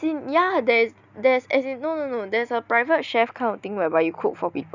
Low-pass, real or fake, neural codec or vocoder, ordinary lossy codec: 7.2 kHz; real; none; none